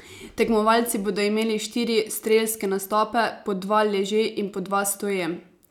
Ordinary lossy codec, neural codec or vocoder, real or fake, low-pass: none; none; real; 19.8 kHz